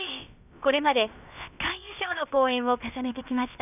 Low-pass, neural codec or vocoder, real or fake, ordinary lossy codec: 3.6 kHz; codec, 16 kHz, about 1 kbps, DyCAST, with the encoder's durations; fake; none